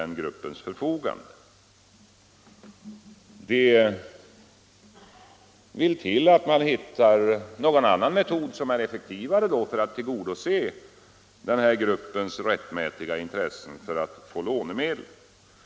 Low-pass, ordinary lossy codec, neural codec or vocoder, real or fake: none; none; none; real